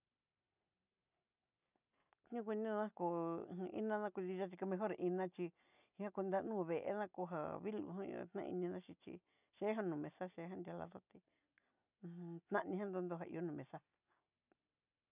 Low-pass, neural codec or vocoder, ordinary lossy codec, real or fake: 3.6 kHz; none; none; real